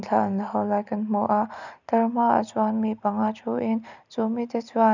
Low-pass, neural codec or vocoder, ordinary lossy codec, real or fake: 7.2 kHz; none; none; real